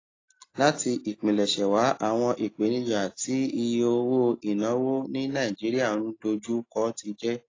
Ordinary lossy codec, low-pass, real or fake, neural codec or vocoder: AAC, 32 kbps; 7.2 kHz; real; none